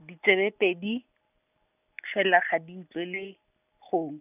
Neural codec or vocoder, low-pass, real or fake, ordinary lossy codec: none; 3.6 kHz; real; none